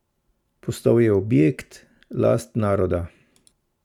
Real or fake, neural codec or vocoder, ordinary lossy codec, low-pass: fake; vocoder, 44.1 kHz, 128 mel bands every 512 samples, BigVGAN v2; none; 19.8 kHz